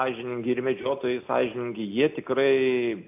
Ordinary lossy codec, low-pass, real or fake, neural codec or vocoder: AAC, 32 kbps; 3.6 kHz; real; none